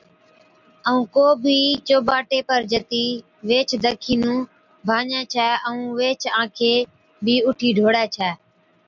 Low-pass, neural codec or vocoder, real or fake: 7.2 kHz; none; real